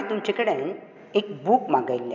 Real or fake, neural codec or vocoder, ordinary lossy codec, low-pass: real; none; MP3, 64 kbps; 7.2 kHz